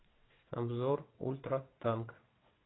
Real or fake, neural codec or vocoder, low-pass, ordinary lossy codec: fake; vocoder, 22.05 kHz, 80 mel bands, WaveNeXt; 7.2 kHz; AAC, 16 kbps